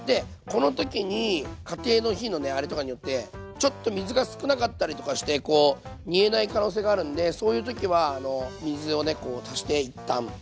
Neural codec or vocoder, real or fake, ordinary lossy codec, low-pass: none; real; none; none